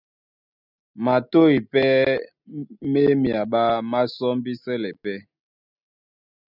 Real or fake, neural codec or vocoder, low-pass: real; none; 5.4 kHz